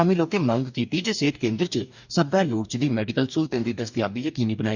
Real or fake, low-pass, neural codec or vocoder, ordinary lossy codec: fake; 7.2 kHz; codec, 44.1 kHz, 2.6 kbps, DAC; none